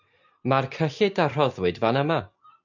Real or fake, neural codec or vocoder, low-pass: real; none; 7.2 kHz